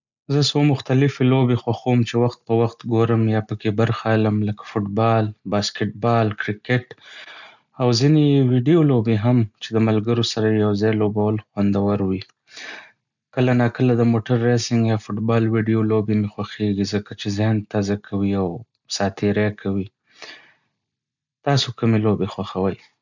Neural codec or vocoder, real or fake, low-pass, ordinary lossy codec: none; real; 7.2 kHz; none